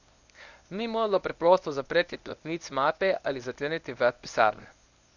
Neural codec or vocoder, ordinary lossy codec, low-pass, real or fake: codec, 24 kHz, 0.9 kbps, WavTokenizer, medium speech release version 1; none; 7.2 kHz; fake